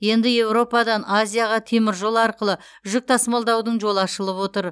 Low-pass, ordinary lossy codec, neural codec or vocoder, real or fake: none; none; none; real